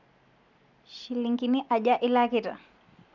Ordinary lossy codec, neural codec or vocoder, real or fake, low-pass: none; none; real; 7.2 kHz